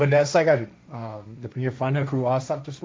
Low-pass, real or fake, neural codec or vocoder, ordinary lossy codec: none; fake; codec, 16 kHz, 1.1 kbps, Voila-Tokenizer; none